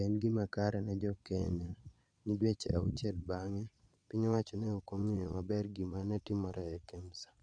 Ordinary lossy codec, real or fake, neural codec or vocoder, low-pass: none; fake; vocoder, 22.05 kHz, 80 mel bands, Vocos; 9.9 kHz